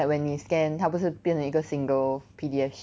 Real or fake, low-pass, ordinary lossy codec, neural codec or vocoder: real; none; none; none